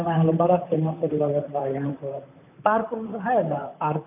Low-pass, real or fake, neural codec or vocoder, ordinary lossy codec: 3.6 kHz; fake; vocoder, 44.1 kHz, 128 mel bands, Pupu-Vocoder; none